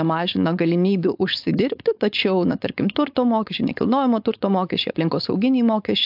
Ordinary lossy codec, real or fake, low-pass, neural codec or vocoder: AAC, 48 kbps; fake; 5.4 kHz; codec, 16 kHz, 4.8 kbps, FACodec